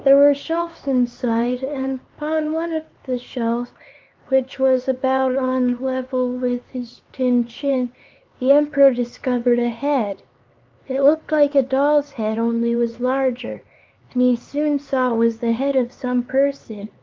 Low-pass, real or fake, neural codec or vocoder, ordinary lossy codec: 7.2 kHz; fake; codec, 16 kHz, 4 kbps, X-Codec, HuBERT features, trained on LibriSpeech; Opus, 32 kbps